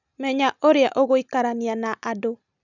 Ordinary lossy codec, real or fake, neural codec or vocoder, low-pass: none; real; none; 7.2 kHz